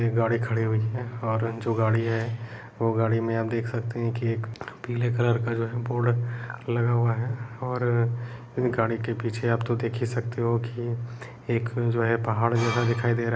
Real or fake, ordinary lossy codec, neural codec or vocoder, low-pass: real; none; none; none